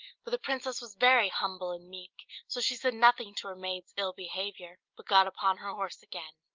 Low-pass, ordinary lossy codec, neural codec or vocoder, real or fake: 7.2 kHz; Opus, 24 kbps; none; real